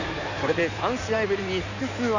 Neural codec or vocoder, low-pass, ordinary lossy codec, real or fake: codec, 16 kHz in and 24 kHz out, 2.2 kbps, FireRedTTS-2 codec; 7.2 kHz; none; fake